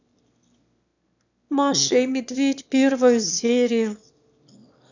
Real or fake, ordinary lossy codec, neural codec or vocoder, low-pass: fake; none; autoencoder, 22.05 kHz, a latent of 192 numbers a frame, VITS, trained on one speaker; 7.2 kHz